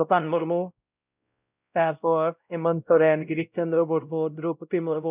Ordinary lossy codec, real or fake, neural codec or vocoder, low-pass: none; fake; codec, 16 kHz, 0.5 kbps, X-Codec, WavLM features, trained on Multilingual LibriSpeech; 3.6 kHz